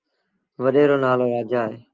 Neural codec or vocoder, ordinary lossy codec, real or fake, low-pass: none; Opus, 24 kbps; real; 7.2 kHz